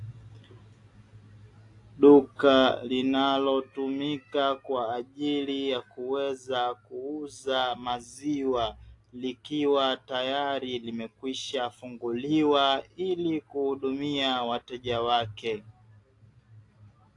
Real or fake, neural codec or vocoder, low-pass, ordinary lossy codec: real; none; 10.8 kHz; AAC, 48 kbps